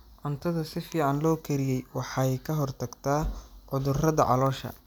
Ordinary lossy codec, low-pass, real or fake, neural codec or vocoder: none; none; real; none